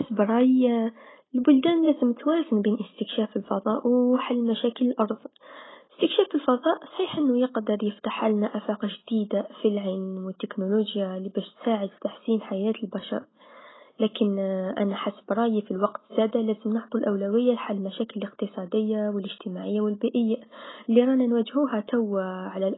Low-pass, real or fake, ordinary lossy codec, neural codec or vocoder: 7.2 kHz; real; AAC, 16 kbps; none